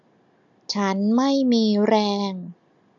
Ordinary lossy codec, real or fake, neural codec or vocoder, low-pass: none; real; none; 7.2 kHz